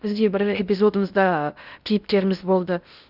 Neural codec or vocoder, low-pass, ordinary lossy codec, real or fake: codec, 16 kHz in and 24 kHz out, 0.6 kbps, FocalCodec, streaming, 2048 codes; 5.4 kHz; Opus, 64 kbps; fake